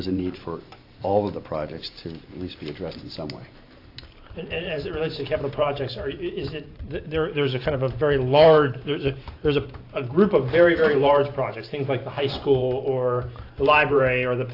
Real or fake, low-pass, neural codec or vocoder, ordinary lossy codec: fake; 5.4 kHz; vocoder, 44.1 kHz, 128 mel bands every 512 samples, BigVGAN v2; AAC, 32 kbps